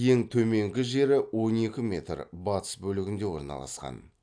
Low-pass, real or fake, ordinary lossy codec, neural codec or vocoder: 9.9 kHz; real; AAC, 64 kbps; none